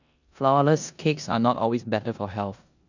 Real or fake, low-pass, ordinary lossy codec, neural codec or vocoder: fake; 7.2 kHz; none; codec, 16 kHz in and 24 kHz out, 0.9 kbps, LongCat-Audio-Codec, four codebook decoder